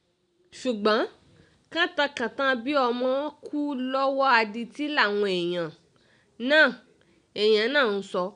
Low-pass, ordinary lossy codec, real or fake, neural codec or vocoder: 9.9 kHz; none; real; none